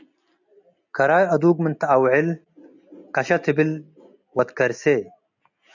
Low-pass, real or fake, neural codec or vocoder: 7.2 kHz; real; none